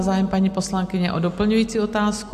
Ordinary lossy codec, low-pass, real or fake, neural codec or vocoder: MP3, 64 kbps; 14.4 kHz; real; none